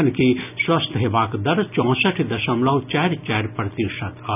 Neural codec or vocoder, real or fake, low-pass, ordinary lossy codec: none; real; 3.6 kHz; none